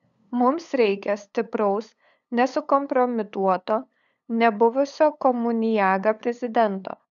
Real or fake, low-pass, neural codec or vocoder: fake; 7.2 kHz; codec, 16 kHz, 8 kbps, FunCodec, trained on LibriTTS, 25 frames a second